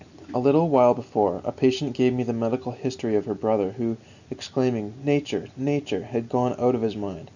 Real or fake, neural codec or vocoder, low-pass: real; none; 7.2 kHz